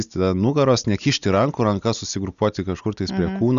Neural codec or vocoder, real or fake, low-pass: none; real; 7.2 kHz